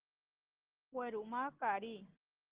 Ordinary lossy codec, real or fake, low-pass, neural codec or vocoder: Opus, 16 kbps; real; 3.6 kHz; none